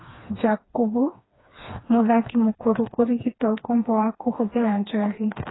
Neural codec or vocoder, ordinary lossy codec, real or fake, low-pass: codec, 16 kHz, 2 kbps, FreqCodec, smaller model; AAC, 16 kbps; fake; 7.2 kHz